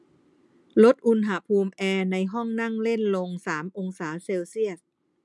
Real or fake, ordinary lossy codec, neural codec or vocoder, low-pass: real; none; none; none